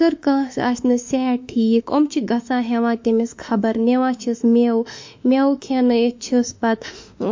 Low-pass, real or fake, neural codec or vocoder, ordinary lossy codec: 7.2 kHz; fake; autoencoder, 48 kHz, 32 numbers a frame, DAC-VAE, trained on Japanese speech; MP3, 48 kbps